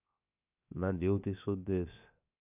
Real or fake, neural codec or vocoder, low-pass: fake; codec, 16 kHz, 0.7 kbps, FocalCodec; 3.6 kHz